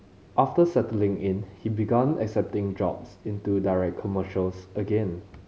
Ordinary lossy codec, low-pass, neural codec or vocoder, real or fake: none; none; none; real